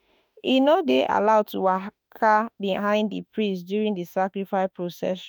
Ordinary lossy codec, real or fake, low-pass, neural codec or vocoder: none; fake; none; autoencoder, 48 kHz, 32 numbers a frame, DAC-VAE, trained on Japanese speech